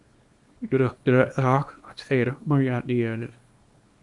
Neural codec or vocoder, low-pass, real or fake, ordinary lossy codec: codec, 24 kHz, 0.9 kbps, WavTokenizer, small release; 10.8 kHz; fake; Opus, 64 kbps